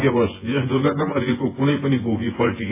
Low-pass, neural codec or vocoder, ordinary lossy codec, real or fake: 3.6 kHz; vocoder, 24 kHz, 100 mel bands, Vocos; AAC, 24 kbps; fake